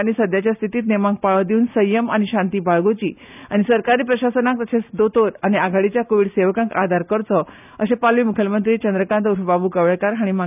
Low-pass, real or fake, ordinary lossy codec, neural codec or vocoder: 3.6 kHz; real; none; none